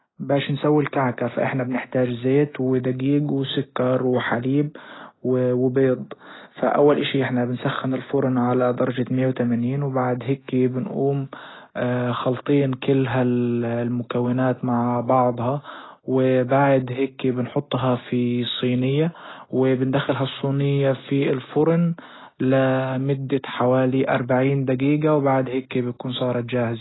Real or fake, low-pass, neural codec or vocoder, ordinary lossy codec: real; 7.2 kHz; none; AAC, 16 kbps